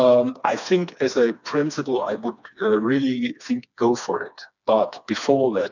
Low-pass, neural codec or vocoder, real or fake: 7.2 kHz; codec, 16 kHz, 2 kbps, FreqCodec, smaller model; fake